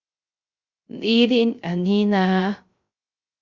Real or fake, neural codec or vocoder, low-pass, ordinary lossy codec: fake; codec, 16 kHz, 0.3 kbps, FocalCodec; 7.2 kHz; Opus, 64 kbps